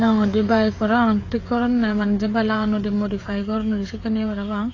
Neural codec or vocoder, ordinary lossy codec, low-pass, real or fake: codec, 16 kHz, 16 kbps, FreqCodec, smaller model; AAC, 32 kbps; 7.2 kHz; fake